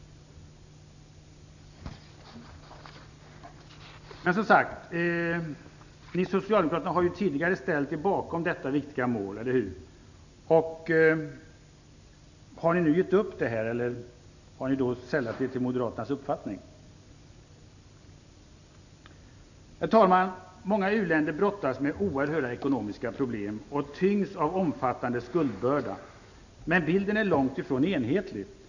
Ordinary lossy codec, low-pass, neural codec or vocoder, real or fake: none; 7.2 kHz; none; real